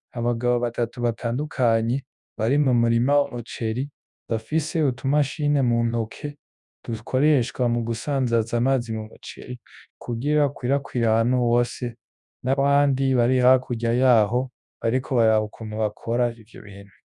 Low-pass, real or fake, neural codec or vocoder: 10.8 kHz; fake; codec, 24 kHz, 0.9 kbps, WavTokenizer, large speech release